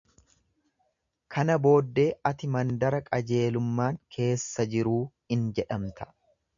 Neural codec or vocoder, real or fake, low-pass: none; real; 7.2 kHz